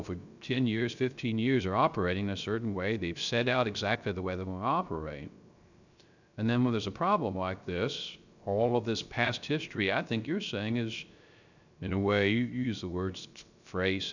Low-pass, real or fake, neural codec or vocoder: 7.2 kHz; fake; codec, 16 kHz, 0.3 kbps, FocalCodec